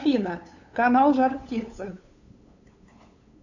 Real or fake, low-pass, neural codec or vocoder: fake; 7.2 kHz; codec, 16 kHz, 8 kbps, FunCodec, trained on LibriTTS, 25 frames a second